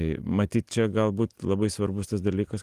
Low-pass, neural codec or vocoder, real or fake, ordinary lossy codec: 14.4 kHz; none; real; Opus, 24 kbps